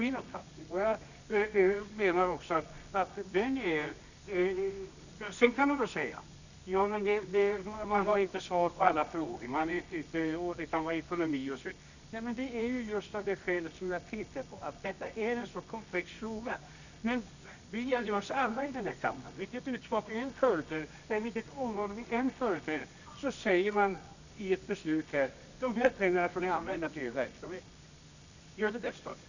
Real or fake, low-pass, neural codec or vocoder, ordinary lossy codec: fake; 7.2 kHz; codec, 24 kHz, 0.9 kbps, WavTokenizer, medium music audio release; none